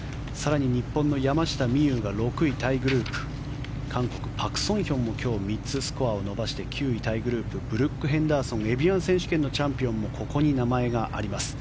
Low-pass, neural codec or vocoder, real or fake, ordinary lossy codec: none; none; real; none